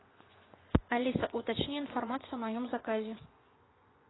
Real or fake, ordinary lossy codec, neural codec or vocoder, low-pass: fake; AAC, 16 kbps; vocoder, 24 kHz, 100 mel bands, Vocos; 7.2 kHz